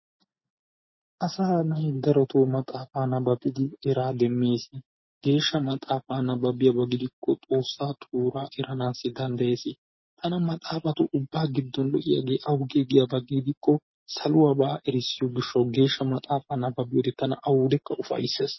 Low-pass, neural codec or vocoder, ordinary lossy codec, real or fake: 7.2 kHz; none; MP3, 24 kbps; real